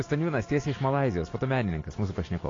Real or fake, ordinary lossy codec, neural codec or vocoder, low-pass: real; AAC, 32 kbps; none; 7.2 kHz